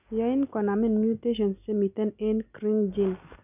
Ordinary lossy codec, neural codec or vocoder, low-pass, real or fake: none; none; 3.6 kHz; real